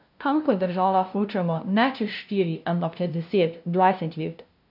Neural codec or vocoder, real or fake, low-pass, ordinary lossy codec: codec, 16 kHz, 0.5 kbps, FunCodec, trained on LibriTTS, 25 frames a second; fake; 5.4 kHz; none